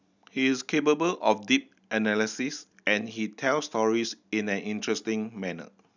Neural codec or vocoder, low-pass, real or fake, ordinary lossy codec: none; 7.2 kHz; real; none